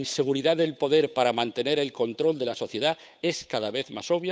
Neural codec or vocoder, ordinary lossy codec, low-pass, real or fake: codec, 16 kHz, 8 kbps, FunCodec, trained on Chinese and English, 25 frames a second; none; none; fake